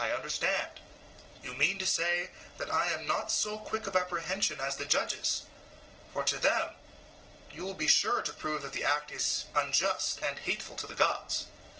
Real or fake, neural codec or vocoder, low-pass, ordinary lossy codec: real; none; 7.2 kHz; Opus, 16 kbps